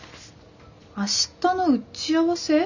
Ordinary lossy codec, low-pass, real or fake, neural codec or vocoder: none; 7.2 kHz; real; none